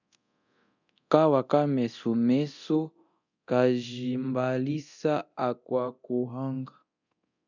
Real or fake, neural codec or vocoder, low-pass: fake; codec, 24 kHz, 0.9 kbps, DualCodec; 7.2 kHz